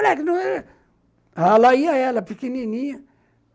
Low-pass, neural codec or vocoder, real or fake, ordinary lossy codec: none; none; real; none